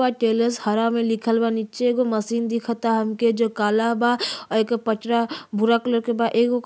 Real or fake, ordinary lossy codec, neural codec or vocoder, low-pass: real; none; none; none